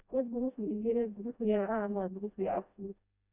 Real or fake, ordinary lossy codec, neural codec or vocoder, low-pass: fake; none; codec, 16 kHz, 1 kbps, FreqCodec, smaller model; 3.6 kHz